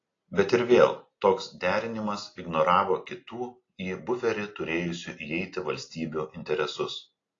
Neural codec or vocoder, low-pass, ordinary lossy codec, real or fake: none; 7.2 kHz; AAC, 32 kbps; real